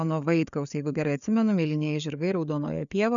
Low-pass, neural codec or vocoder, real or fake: 7.2 kHz; codec, 16 kHz, 4 kbps, FreqCodec, larger model; fake